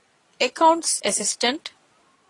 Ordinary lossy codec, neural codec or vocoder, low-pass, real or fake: AAC, 32 kbps; none; 10.8 kHz; real